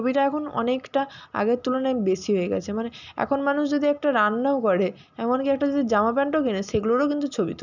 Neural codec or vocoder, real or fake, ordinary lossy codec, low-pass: none; real; none; 7.2 kHz